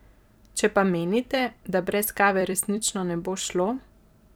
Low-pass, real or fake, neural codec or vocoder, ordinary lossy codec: none; fake; vocoder, 44.1 kHz, 128 mel bands every 256 samples, BigVGAN v2; none